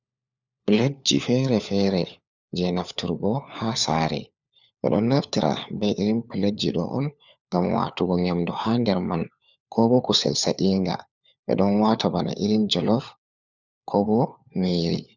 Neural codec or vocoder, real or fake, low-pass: codec, 16 kHz, 4 kbps, FunCodec, trained on LibriTTS, 50 frames a second; fake; 7.2 kHz